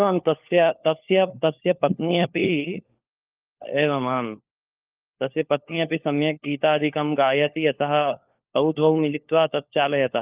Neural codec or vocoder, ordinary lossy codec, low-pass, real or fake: codec, 16 kHz, 4 kbps, FunCodec, trained on LibriTTS, 50 frames a second; Opus, 24 kbps; 3.6 kHz; fake